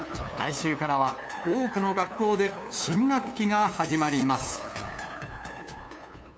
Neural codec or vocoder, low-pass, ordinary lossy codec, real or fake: codec, 16 kHz, 2 kbps, FunCodec, trained on LibriTTS, 25 frames a second; none; none; fake